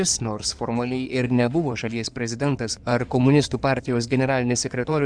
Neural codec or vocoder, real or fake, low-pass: codec, 16 kHz in and 24 kHz out, 2.2 kbps, FireRedTTS-2 codec; fake; 9.9 kHz